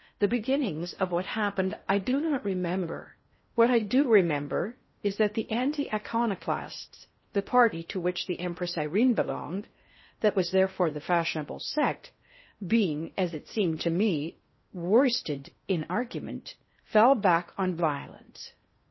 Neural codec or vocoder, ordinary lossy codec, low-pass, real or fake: codec, 16 kHz in and 24 kHz out, 0.6 kbps, FocalCodec, streaming, 4096 codes; MP3, 24 kbps; 7.2 kHz; fake